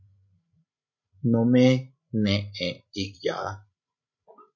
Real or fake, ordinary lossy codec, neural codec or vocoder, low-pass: fake; MP3, 48 kbps; codec, 16 kHz, 16 kbps, FreqCodec, larger model; 7.2 kHz